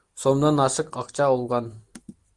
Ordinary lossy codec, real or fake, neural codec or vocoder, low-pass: Opus, 24 kbps; real; none; 10.8 kHz